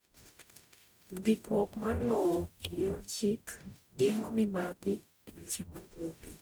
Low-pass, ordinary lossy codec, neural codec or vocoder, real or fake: none; none; codec, 44.1 kHz, 0.9 kbps, DAC; fake